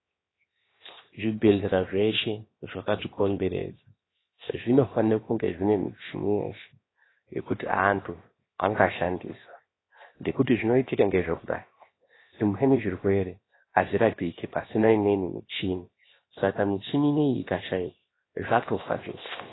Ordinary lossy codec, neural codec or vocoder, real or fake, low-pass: AAC, 16 kbps; codec, 16 kHz, 0.7 kbps, FocalCodec; fake; 7.2 kHz